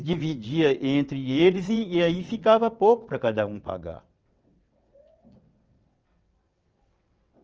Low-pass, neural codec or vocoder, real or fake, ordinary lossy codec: 7.2 kHz; vocoder, 22.05 kHz, 80 mel bands, WaveNeXt; fake; Opus, 24 kbps